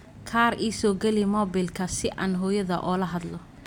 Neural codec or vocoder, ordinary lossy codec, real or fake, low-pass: none; none; real; 19.8 kHz